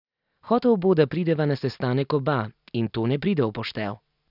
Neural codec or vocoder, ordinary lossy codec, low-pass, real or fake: none; none; 5.4 kHz; real